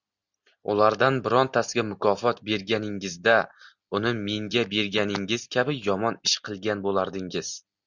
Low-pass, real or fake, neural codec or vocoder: 7.2 kHz; real; none